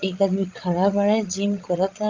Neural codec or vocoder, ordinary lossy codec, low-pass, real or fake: codec, 16 kHz, 16 kbps, FreqCodec, larger model; Opus, 32 kbps; 7.2 kHz; fake